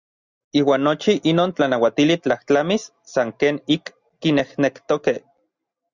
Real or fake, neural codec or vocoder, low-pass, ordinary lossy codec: real; none; 7.2 kHz; Opus, 64 kbps